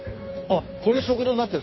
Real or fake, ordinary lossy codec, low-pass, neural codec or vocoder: fake; MP3, 24 kbps; 7.2 kHz; codec, 16 kHz in and 24 kHz out, 1.1 kbps, FireRedTTS-2 codec